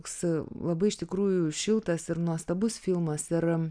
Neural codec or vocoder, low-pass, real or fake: none; 9.9 kHz; real